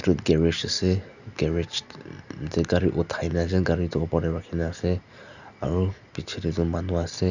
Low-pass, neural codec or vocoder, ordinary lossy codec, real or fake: 7.2 kHz; none; none; real